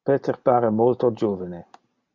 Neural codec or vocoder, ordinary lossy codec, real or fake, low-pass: none; MP3, 64 kbps; real; 7.2 kHz